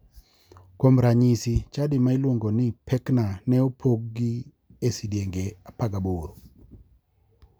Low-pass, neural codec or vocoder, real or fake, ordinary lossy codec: none; none; real; none